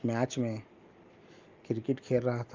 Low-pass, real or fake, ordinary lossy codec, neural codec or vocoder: 7.2 kHz; real; Opus, 24 kbps; none